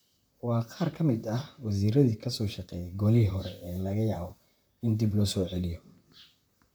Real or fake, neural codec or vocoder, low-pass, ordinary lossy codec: fake; vocoder, 44.1 kHz, 128 mel bands, Pupu-Vocoder; none; none